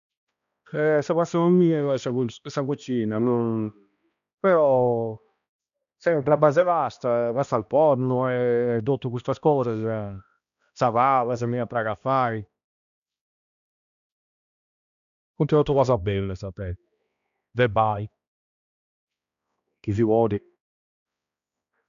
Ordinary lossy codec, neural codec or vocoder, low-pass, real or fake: none; codec, 16 kHz, 1 kbps, X-Codec, HuBERT features, trained on balanced general audio; 7.2 kHz; fake